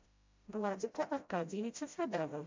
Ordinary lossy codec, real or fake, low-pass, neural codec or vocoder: MP3, 48 kbps; fake; 7.2 kHz; codec, 16 kHz, 0.5 kbps, FreqCodec, smaller model